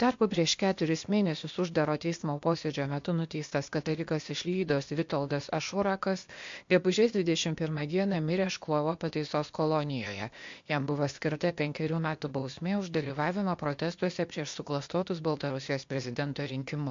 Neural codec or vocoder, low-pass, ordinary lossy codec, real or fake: codec, 16 kHz, 0.8 kbps, ZipCodec; 7.2 kHz; MP3, 48 kbps; fake